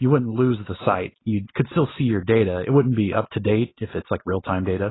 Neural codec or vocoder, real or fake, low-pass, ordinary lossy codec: none; real; 7.2 kHz; AAC, 16 kbps